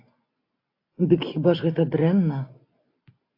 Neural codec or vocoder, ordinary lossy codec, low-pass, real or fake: none; AAC, 32 kbps; 5.4 kHz; real